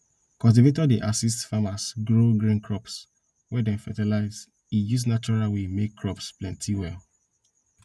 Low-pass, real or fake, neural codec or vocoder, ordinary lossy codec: none; real; none; none